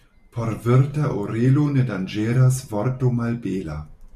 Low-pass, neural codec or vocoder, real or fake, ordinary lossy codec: 14.4 kHz; none; real; MP3, 96 kbps